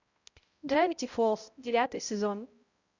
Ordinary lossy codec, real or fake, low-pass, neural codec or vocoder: Opus, 64 kbps; fake; 7.2 kHz; codec, 16 kHz, 0.5 kbps, X-Codec, HuBERT features, trained on balanced general audio